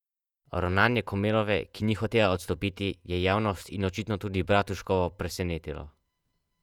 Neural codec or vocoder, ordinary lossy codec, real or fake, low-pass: vocoder, 48 kHz, 128 mel bands, Vocos; none; fake; 19.8 kHz